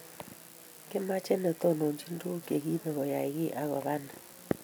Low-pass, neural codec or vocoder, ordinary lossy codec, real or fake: none; none; none; real